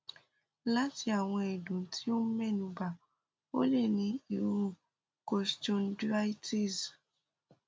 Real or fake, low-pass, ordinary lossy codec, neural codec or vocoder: real; none; none; none